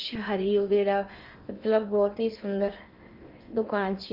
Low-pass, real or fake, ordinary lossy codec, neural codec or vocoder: 5.4 kHz; fake; Opus, 24 kbps; codec, 16 kHz in and 24 kHz out, 0.8 kbps, FocalCodec, streaming, 65536 codes